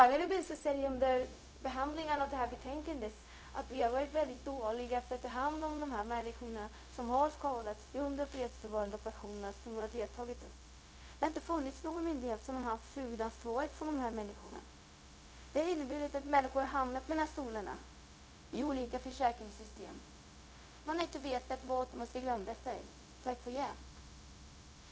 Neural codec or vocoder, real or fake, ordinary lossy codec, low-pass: codec, 16 kHz, 0.4 kbps, LongCat-Audio-Codec; fake; none; none